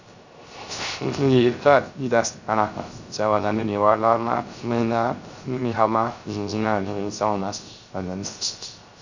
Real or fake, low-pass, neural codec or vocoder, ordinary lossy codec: fake; 7.2 kHz; codec, 16 kHz, 0.3 kbps, FocalCodec; Opus, 64 kbps